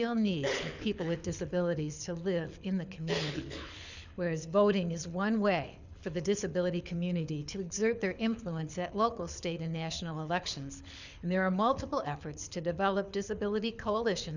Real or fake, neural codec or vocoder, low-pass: fake; codec, 24 kHz, 6 kbps, HILCodec; 7.2 kHz